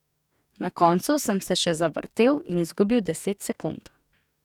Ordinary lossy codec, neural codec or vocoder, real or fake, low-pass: none; codec, 44.1 kHz, 2.6 kbps, DAC; fake; 19.8 kHz